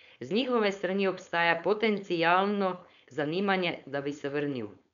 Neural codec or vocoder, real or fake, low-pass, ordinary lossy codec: codec, 16 kHz, 4.8 kbps, FACodec; fake; 7.2 kHz; none